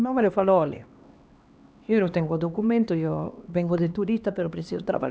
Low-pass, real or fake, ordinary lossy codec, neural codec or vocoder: none; fake; none; codec, 16 kHz, 2 kbps, X-Codec, HuBERT features, trained on LibriSpeech